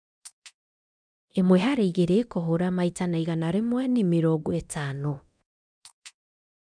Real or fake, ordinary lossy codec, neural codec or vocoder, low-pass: fake; none; codec, 24 kHz, 0.9 kbps, DualCodec; 9.9 kHz